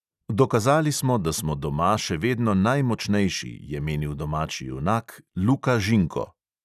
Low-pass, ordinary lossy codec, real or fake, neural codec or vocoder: 14.4 kHz; none; real; none